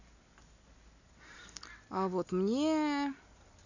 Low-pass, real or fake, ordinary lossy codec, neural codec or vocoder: 7.2 kHz; real; none; none